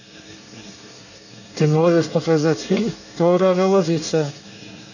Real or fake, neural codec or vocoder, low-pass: fake; codec, 24 kHz, 1 kbps, SNAC; 7.2 kHz